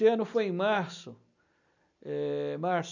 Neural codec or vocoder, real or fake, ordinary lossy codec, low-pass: none; real; none; 7.2 kHz